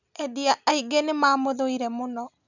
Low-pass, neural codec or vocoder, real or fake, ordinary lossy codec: 7.2 kHz; none; real; none